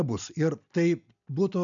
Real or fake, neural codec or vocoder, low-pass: real; none; 7.2 kHz